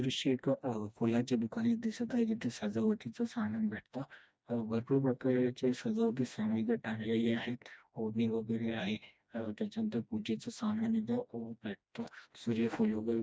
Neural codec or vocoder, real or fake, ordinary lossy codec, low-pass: codec, 16 kHz, 1 kbps, FreqCodec, smaller model; fake; none; none